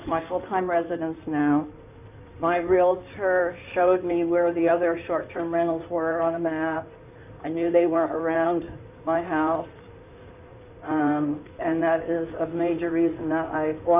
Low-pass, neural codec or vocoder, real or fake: 3.6 kHz; codec, 16 kHz in and 24 kHz out, 2.2 kbps, FireRedTTS-2 codec; fake